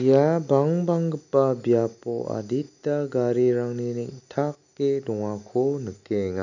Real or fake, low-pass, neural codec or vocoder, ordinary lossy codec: real; 7.2 kHz; none; none